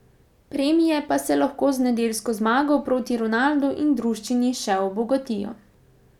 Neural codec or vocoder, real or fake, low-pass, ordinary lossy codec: none; real; 19.8 kHz; none